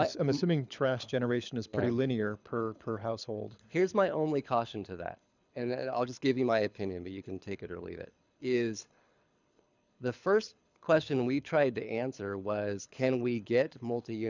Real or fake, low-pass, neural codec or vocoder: fake; 7.2 kHz; codec, 24 kHz, 6 kbps, HILCodec